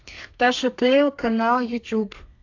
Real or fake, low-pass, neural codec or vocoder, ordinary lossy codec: fake; 7.2 kHz; codec, 32 kHz, 1.9 kbps, SNAC; AAC, 48 kbps